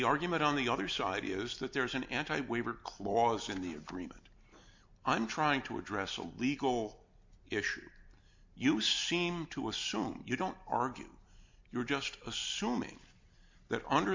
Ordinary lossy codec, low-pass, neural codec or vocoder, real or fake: MP3, 48 kbps; 7.2 kHz; none; real